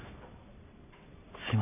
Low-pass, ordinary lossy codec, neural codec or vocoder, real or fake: 3.6 kHz; none; none; real